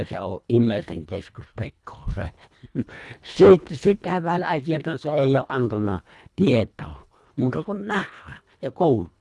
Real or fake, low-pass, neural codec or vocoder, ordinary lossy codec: fake; none; codec, 24 kHz, 1.5 kbps, HILCodec; none